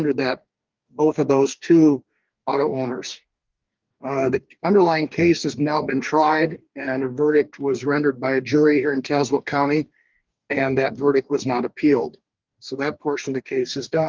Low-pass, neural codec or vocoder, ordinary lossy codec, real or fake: 7.2 kHz; codec, 44.1 kHz, 2.6 kbps, DAC; Opus, 24 kbps; fake